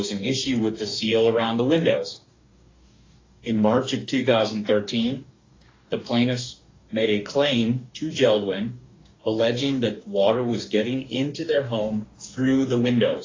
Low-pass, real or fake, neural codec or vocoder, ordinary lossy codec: 7.2 kHz; fake; codec, 44.1 kHz, 2.6 kbps, DAC; AAC, 32 kbps